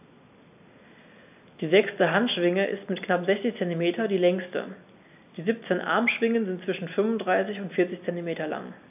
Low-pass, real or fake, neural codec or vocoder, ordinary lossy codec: 3.6 kHz; real; none; AAC, 32 kbps